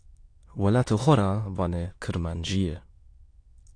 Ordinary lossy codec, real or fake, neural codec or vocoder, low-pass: AAC, 48 kbps; fake; autoencoder, 22.05 kHz, a latent of 192 numbers a frame, VITS, trained on many speakers; 9.9 kHz